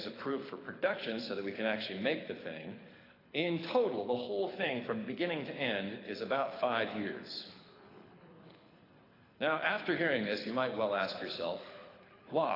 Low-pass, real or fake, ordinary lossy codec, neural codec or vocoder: 5.4 kHz; fake; AAC, 24 kbps; codec, 24 kHz, 6 kbps, HILCodec